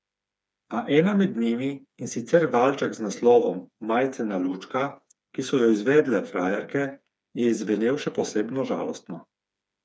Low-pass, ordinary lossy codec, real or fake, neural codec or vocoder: none; none; fake; codec, 16 kHz, 4 kbps, FreqCodec, smaller model